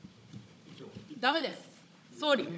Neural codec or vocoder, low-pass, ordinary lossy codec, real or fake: codec, 16 kHz, 16 kbps, FunCodec, trained on Chinese and English, 50 frames a second; none; none; fake